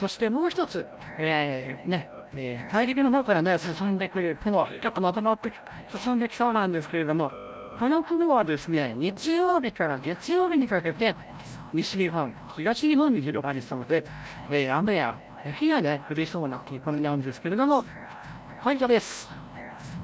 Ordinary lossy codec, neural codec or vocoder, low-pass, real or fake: none; codec, 16 kHz, 0.5 kbps, FreqCodec, larger model; none; fake